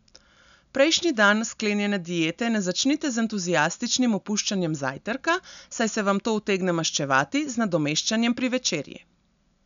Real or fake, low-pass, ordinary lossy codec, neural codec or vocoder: real; 7.2 kHz; none; none